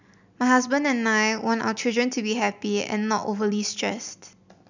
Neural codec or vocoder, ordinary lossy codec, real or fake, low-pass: none; none; real; 7.2 kHz